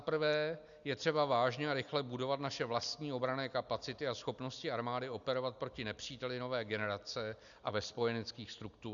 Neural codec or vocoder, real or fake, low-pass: none; real; 7.2 kHz